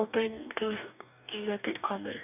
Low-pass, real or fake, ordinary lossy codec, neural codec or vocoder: 3.6 kHz; fake; none; codec, 44.1 kHz, 2.6 kbps, DAC